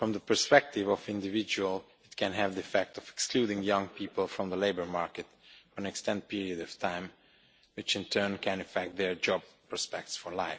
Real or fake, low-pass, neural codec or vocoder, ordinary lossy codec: real; none; none; none